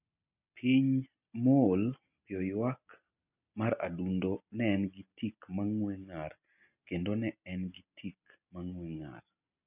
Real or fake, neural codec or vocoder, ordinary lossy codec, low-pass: real; none; none; 3.6 kHz